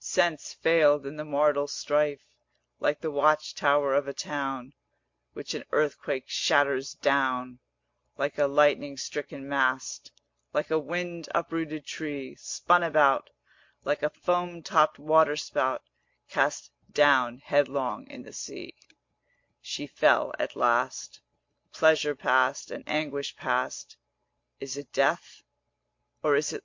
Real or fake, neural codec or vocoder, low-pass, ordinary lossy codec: real; none; 7.2 kHz; MP3, 48 kbps